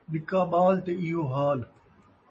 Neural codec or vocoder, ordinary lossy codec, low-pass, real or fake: none; MP3, 32 kbps; 10.8 kHz; real